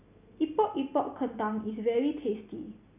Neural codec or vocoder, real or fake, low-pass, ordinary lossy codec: none; real; 3.6 kHz; none